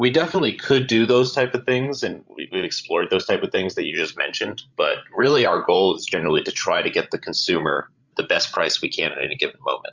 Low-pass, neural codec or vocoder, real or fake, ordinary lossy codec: 7.2 kHz; codec, 16 kHz, 16 kbps, FreqCodec, larger model; fake; Opus, 64 kbps